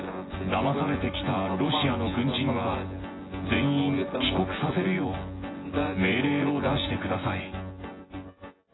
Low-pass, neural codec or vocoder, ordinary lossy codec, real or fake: 7.2 kHz; vocoder, 24 kHz, 100 mel bands, Vocos; AAC, 16 kbps; fake